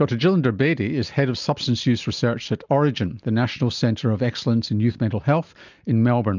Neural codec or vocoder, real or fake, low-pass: none; real; 7.2 kHz